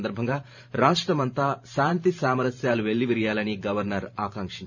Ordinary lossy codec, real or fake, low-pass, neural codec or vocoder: none; fake; 7.2 kHz; vocoder, 44.1 kHz, 128 mel bands every 512 samples, BigVGAN v2